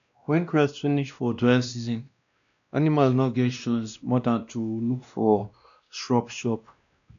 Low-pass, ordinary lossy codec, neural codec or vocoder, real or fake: 7.2 kHz; none; codec, 16 kHz, 1 kbps, X-Codec, WavLM features, trained on Multilingual LibriSpeech; fake